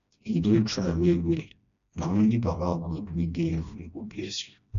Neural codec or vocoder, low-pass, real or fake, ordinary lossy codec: codec, 16 kHz, 1 kbps, FreqCodec, smaller model; 7.2 kHz; fake; AAC, 96 kbps